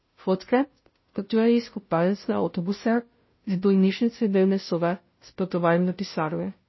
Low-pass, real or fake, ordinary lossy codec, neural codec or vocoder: 7.2 kHz; fake; MP3, 24 kbps; codec, 16 kHz, 0.5 kbps, FunCodec, trained on Chinese and English, 25 frames a second